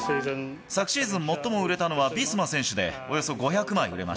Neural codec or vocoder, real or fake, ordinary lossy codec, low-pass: none; real; none; none